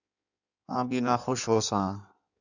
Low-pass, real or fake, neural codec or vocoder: 7.2 kHz; fake; codec, 16 kHz in and 24 kHz out, 1.1 kbps, FireRedTTS-2 codec